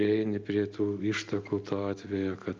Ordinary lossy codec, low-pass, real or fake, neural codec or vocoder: Opus, 24 kbps; 7.2 kHz; real; none